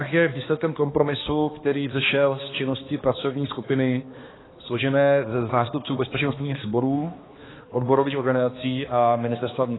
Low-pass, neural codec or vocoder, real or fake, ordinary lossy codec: 7.2 kHz; codec, 16 kHz, 2 kbps, X-Codec, HuBERT features, trained on balanced general audio; fake; AAC, 16 kbps